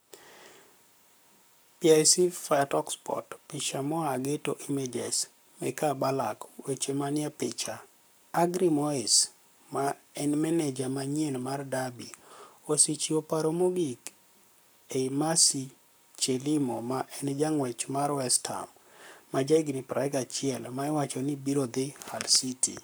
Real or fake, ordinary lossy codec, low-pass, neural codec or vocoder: fake; none; none; codec, 44.1 kHz, 7.8 kbps, Pupu-Codec